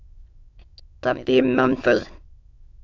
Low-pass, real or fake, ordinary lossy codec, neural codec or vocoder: 7.2 kHz; fake; AAC, 48 kbps; autoencoder, 22.05 kHz, a latent of 192 numbers a frame, VITS, trained on many speakers